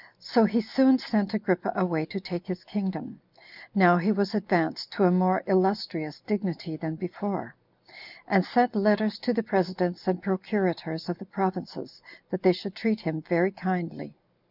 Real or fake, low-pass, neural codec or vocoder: real; 5.4 kHz; none